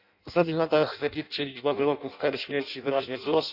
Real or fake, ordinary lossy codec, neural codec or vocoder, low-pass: fake; none; codec, 16 kHz in and 24 kHz out, 0.6 kbps, FireRedTTS-2 codec; 5.4 kHz